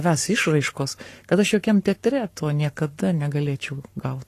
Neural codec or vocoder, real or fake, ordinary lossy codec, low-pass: codec, 44.1 kHz, 7.8 kbps, Pupu-Codec; fake; AAC, 48 kbps; 14.4 kHz